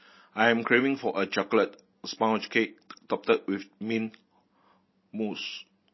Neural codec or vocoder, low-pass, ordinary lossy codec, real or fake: none; 7.2 kHz; MP3, 24 kbps; real